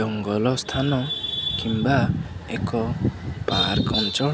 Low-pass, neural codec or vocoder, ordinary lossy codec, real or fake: none; none; none; real